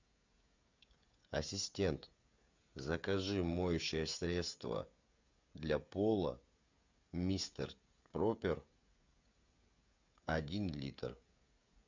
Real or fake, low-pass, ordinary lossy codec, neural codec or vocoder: fake; 7.2 kHz; MP3, 64 kbps; codec, 16 kHz, 16 kbps, FreqCodec, smaller model